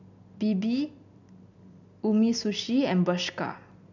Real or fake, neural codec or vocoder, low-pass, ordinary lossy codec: real; none; 7.2 kHz; none